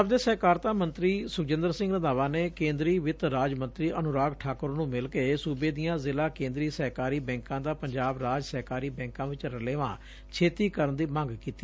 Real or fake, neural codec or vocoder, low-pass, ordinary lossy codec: real; none; none; none